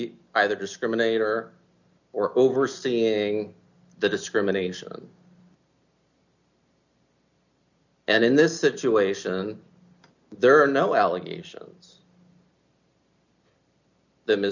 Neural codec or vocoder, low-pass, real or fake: none; 7.2 kHz; real